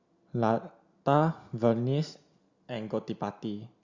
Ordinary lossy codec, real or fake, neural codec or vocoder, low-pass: Opus, 64 kbps; real; none; 7.2 kHz